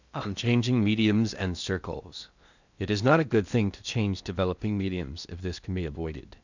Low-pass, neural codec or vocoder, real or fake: 7.2 kHz; codec, 16 kHz in and 24 kHz out, 0.8 kbps, FocalCodec, streaming, 65536 codes; fake